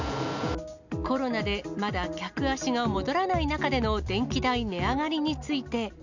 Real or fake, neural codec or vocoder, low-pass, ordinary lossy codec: real; none; 7.2 kHz; none